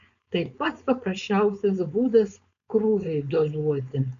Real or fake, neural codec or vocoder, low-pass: fake; codec, 16 kHz, 4.8 kbps, FACodec; 7.2 kHz